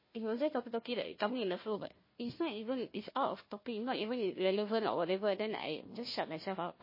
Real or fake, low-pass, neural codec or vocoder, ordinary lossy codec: fake; 5.4 kHz; codec, 16 kHz, 1 kbps, FunCodec, trained on Chinese and English, 50 frames a second; MP3, 24 kbps